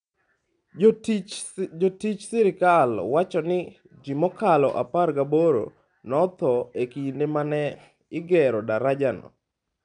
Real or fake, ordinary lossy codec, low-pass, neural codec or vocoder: real; none; 9.9 kHz; none